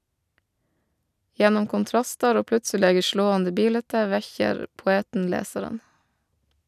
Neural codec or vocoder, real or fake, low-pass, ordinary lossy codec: none; real; 14.4 kHz; none